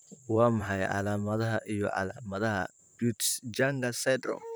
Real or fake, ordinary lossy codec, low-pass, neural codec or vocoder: fake; none; none; vocoder, 44.1 kHz, 128 mel bands, Pupu-Vocoder